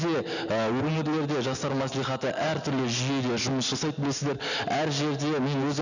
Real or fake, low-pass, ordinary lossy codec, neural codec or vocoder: real; 7.2 kHz; none; none